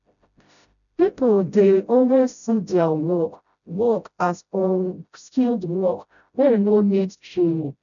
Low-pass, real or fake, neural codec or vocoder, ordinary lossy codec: 7.2 kHz; fake; codec, 16 kHz, 0.5 kbps, FreqCodec, smaller model; none